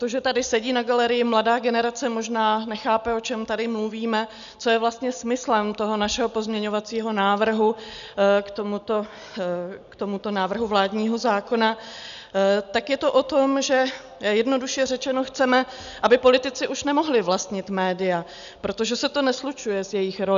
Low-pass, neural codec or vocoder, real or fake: 7.2 kHz; none; real